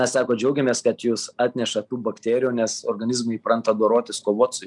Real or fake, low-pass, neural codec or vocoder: real; 10.8 kHz; none